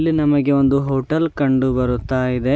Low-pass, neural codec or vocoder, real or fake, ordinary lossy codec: none; none; real; none